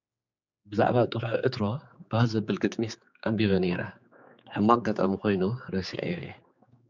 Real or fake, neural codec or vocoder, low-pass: fake; codec, 16 kHz, 4 kbps, X-Codec, HuBERT features, trained on general audio; 7.2 kHz